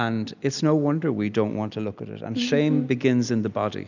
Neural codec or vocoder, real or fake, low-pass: none; real; 7.2 kHz